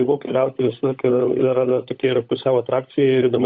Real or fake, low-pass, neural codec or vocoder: fake; 7.2 kHz; codec, 16 kHz, 16 kbps, FunCodec, trained on LibriTTS, 50 frames a second